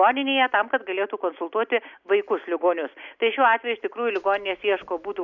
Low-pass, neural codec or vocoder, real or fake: 7.2 kHz; none; real